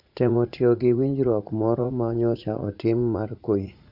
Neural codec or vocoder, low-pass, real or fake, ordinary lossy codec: vocoder, 44.1 kHz, 80 mel bands, Vocos; 5.4 kHz; fake; none